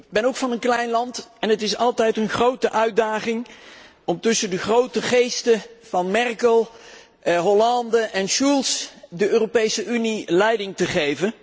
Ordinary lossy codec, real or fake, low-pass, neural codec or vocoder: none; real; none; none